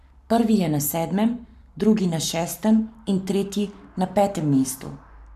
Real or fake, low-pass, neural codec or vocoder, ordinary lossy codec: fake; 14.4 kHz; codec, 44.1 kHz, 7.8 kbps, Pupu-Codec; none